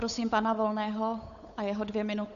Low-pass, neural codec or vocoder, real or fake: 7.2 kHz; codec, 16 kHz, 8 kbps, FunCodec, trained on Chinese and English, 25 frames a second; fake